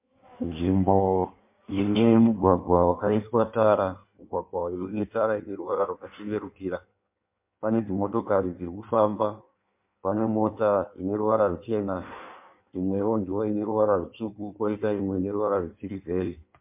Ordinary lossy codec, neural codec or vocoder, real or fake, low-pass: MP3, 32 kbps; codec, 16 kHz in and 24 kHz out, 1.1 kbps, FireRedTTS-2 codec; fake; 3.6 kHz